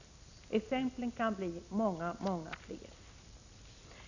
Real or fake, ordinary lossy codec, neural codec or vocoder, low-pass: real; none; none; 7.2 kHz